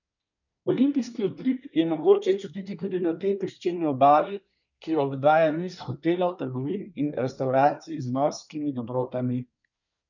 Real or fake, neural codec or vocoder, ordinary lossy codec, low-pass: fake; codec, 24 kHz, 1 kbps, SNAC; none; 7.2 kHz